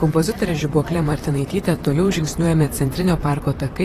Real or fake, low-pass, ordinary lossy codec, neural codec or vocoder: fake; 14.4 kHz; AAC, 48 kbps; vocoder, 44.1 kHz, 128 mel bands, Pupu-Vocoder